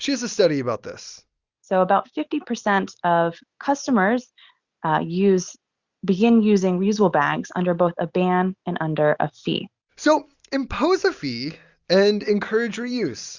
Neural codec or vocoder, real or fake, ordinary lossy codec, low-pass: none; real; Opus, 64 kbps; 7.2 kHz